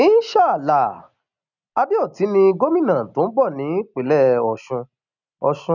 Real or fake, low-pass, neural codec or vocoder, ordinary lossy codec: real; 7.2 kHz; none; none